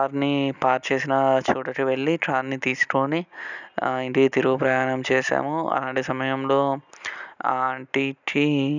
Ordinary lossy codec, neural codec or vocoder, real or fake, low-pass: none; none; real; 7.2 kHz